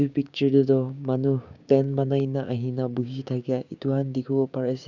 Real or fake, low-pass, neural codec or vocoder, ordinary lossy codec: fake; 7.2 kHz; codec, 16 kHz, 6 kbps, DAC; none